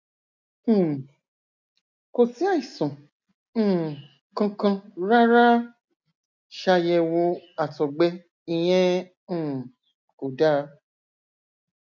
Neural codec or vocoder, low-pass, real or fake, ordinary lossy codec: none; 7.2 kHz; real; none